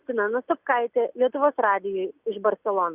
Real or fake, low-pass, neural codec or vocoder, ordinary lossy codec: real; 3.6 kHz; none; Opus, 64 kbps